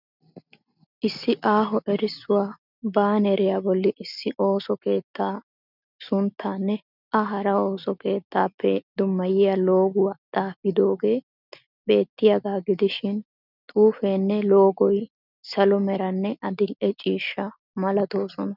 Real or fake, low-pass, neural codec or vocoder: real; 5.4 kHz; none